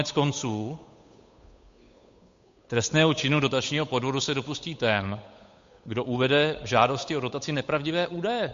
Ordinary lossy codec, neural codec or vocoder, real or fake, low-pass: MP3, 48 kbps; codec, 16 kHz, 8 kbps, FunCodec, trained on Chinese and English, 25 frames a second; fake; 7.2 kHz